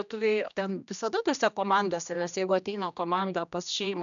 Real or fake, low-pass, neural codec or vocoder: fake; 7.2 kHz; codec, 16 kHz, 1 kbps, X-Codec, HuBERT features, trained on general audio